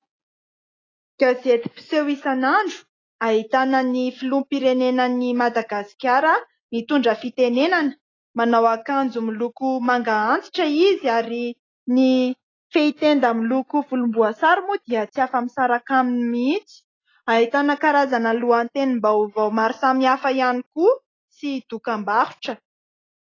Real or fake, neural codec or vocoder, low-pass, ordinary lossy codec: real; none; 7.2 kHz; AAC, 32 kbps